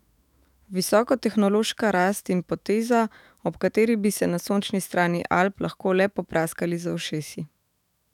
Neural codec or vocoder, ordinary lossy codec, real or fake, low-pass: autoencoder, 48 kHz, 128 numbers a frame, DAC-VAE, trained on Japanese speech; none; fake; 19.8 kHz